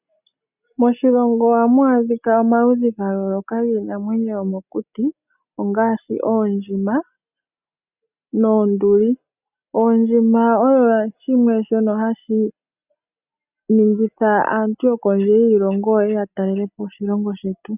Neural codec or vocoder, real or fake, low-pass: none; real; 3.6 kHz